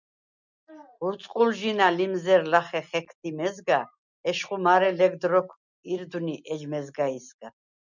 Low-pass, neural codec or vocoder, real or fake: 7.2 kHz; none; real